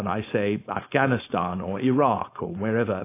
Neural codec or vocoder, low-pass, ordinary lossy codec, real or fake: codec, 16 kHz, 4.8 kbps, FACodec; 3.6 kHz; AAC, 24 kbps; fake